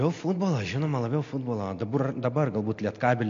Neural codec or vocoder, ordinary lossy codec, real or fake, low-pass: none; MP3, 48 kbps; real; 7.2 kHz